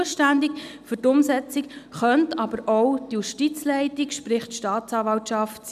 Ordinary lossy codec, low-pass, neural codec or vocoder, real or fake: none; 14.4 kHz; none; real